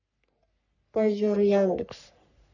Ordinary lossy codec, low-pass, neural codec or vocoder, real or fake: none; 7.2 kHz; codec, 44.1 kHz, 3.4 kbps, Pupu-Codec; fake